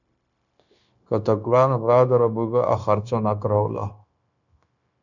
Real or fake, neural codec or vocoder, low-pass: fake; codec, 16 kHz, 0.9 kbps, LongCat-Audio-Codec; 7.2 kHz